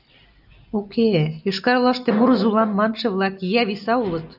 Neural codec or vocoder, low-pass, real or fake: none; 5.4 kHz; real